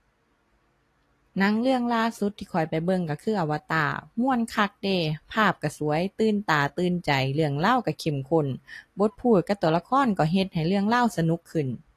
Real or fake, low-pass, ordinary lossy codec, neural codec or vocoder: real; 14.4 kHz; AAC, 48 kbps; none